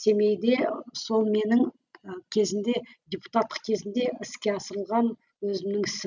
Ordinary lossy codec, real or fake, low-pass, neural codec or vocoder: none; real; 7.2 kHz; none